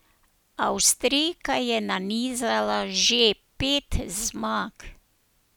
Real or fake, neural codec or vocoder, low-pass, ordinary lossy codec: real; none; none; none